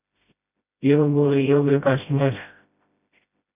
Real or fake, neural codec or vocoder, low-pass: fake; codec, 16 kHz, 0.5 kbps, FreqCodec, smaller model; 3.6 kHz